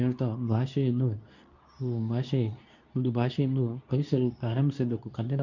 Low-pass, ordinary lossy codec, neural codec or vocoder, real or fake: 7.2 kHz; none; codec, 24 kHz, 0.9 kbps, WavTokenizer, medium speech release version 2; fake